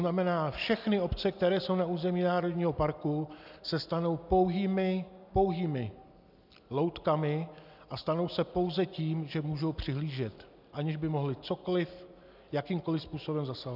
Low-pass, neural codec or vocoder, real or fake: 5.4 kHz; none; real